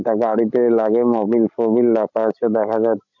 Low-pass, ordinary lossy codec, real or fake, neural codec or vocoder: 7.2 kHz; none; fake; codec, 16 kHz, 16 kbps, FreqCodec, larger model